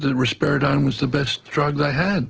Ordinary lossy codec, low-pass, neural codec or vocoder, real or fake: Opus, 16 kbps; 7.2 kHz; none; real